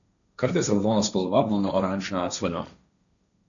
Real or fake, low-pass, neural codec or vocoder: fake; 7.2 kHz; codec, 16 kHz, 1.1 kbps, Voila-Tokenizer